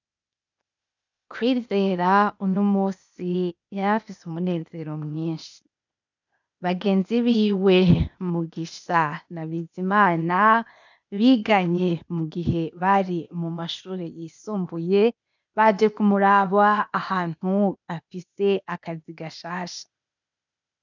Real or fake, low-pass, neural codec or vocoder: fake; 7.2 kHz; codec, 16 kHz, 0.8 kbps, ZipCodec